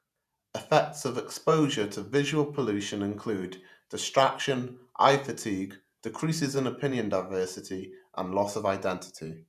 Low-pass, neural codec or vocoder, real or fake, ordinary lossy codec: 14.4 kHz; vocoder, 48 kHz, 128 mel bands, Vocos; fake; none